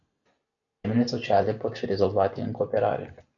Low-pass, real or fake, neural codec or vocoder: 7.2 kHz; real; none